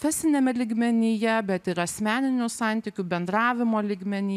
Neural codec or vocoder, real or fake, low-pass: none; real; 14.4 kHz